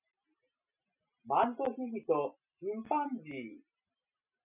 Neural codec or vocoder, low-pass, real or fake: none; 3.6 kHz; real